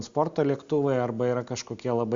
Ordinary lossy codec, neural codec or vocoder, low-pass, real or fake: Opus, 64 kbps; none; 7.2 kHz; real